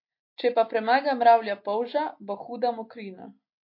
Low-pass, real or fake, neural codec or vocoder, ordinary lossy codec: 5.4 kHz; real; none; MP3, 32 kbps